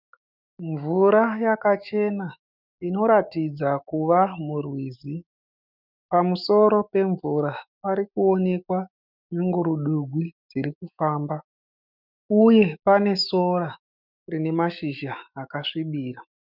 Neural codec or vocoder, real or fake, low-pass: autoencoder, 48 kHz, 128 numbers a frame, DAC-VAE, trained on Japanese speech; fake; 5.4 kHz